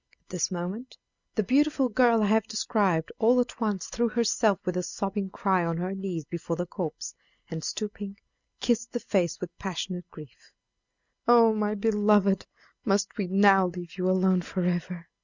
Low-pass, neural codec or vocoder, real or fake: 7.2 kHz; none; real